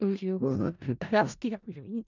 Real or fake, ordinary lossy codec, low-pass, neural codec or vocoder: fake; none; 7.2 kHz; codec, 16 kHz in and 24 kHz out, 0.4 kbps, LongCat-Audio-Codec, four codebook decoder